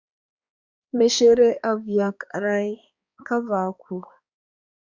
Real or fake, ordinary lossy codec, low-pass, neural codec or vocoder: fake; Opus, 64 kbps; 7.2 kHz; codec, 16 kHz, 2 kbps, X-Codec, HuBERT features, trained on balanced general audio